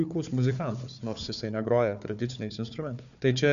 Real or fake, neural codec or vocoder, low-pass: fake; codec, 16 kHz, 4 kbps, FunCodec, trained on Chinese and English, 50 frames a second; 7.2 kHz